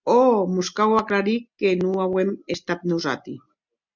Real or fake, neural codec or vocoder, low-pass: real; none; 7.2 kHz